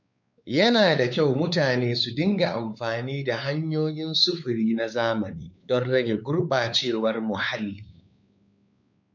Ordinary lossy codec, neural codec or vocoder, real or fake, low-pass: none; codec, 16 kHz, 4 kbps, X-Codec, WavLM features, trained on Multilingual LibriSpeech; fake; 7.2 kHz